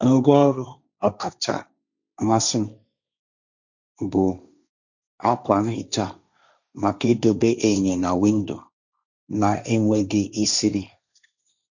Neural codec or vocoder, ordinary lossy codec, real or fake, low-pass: codec, 16 kHz, 1.1 kbps, Voila-Tokenizer; none; fake; 7.2 kHz